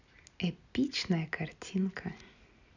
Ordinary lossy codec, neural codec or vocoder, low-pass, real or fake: none; none; 7.2 kHz; real